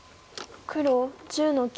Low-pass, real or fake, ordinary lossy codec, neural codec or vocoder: none; real; none; none